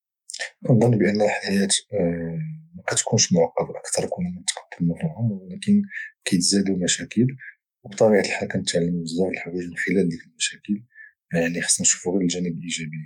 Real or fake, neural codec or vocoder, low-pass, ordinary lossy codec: fake; autoencoder, 48 kHz, 128 numbers a frame, DAC-VAE, trained on Japanese speech; 19.8 kHz; none